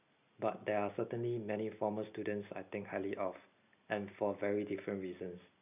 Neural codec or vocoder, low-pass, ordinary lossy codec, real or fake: none; 3.6 kHz; none; real